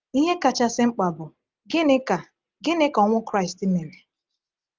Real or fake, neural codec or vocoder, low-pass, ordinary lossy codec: real; none; 7.2 kHz; Opus, 24 kbps